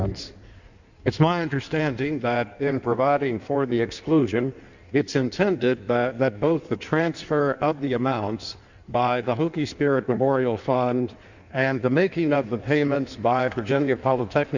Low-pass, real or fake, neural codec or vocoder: 7.2 kHz; fake; codec, 16 kHz in and 24 kHz out, 1.1 kbps, FireRedTTS-2 codec